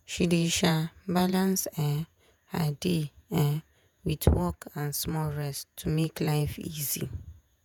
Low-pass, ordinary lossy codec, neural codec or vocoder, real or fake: none; none; vocoder, 48 kHz, 128 mel bands, Vocos; fake